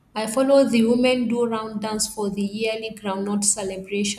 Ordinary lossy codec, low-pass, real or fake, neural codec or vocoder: none; 14.4 kHz; real; none